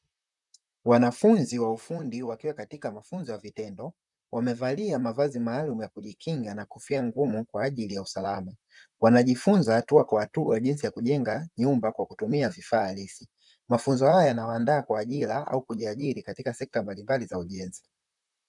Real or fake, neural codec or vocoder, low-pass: fake; vocoder, 44.1 kHz, 128 mel bands, Pupu-Vocoder; 10.8 kHz